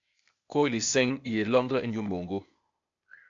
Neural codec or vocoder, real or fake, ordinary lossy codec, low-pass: codec, 16 kHz, 0.8 kbps, ZipCodec; fake; AAC, 64 kbps; 7.2 kHz